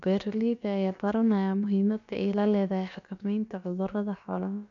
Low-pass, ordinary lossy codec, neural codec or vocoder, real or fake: 7.2 kHz; none; codec, 16 kHz, about 1 kbps, DyCAST, with the encoder's durations; fake